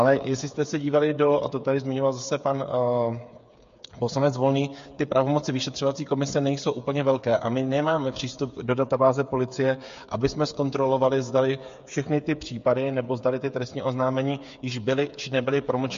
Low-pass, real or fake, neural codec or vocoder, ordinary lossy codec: 7.2 kHz; fake; codec, 16 kHz, 8 kbps, FreqCodec, smaller model; MP3, 48 kbps